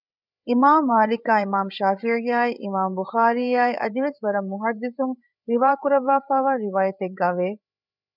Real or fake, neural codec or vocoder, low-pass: fake; codec, 16 kHz, 8 kbps, FreqCodec, larger model; 5.4 kHz